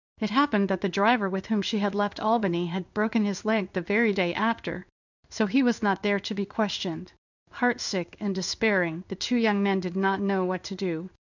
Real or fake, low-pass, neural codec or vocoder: fake; 7.2 kHz; codec, 16 kHz in and 24 kHz out, 1 kbps, XY-Tokenizer